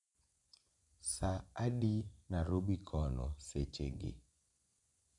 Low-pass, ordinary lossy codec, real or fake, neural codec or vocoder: 10.8 kHz; none; fake; vocoder, 24 kHz, 100 mel bands, Vocos